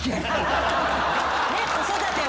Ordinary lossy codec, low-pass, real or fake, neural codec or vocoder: none; none; real; none